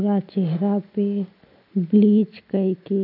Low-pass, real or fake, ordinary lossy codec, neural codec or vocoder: 5.4 kHz; real; none; none